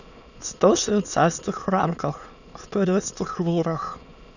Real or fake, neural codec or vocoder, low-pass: fake; autoencoder, 22.05 kHz, a latent of 192 numbers a frame, VITS, trained on many speakers; 7.2 kHz